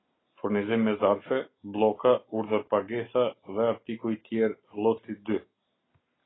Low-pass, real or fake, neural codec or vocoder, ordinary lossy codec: 7.2 kHz; real; none; AAC, 16 kbps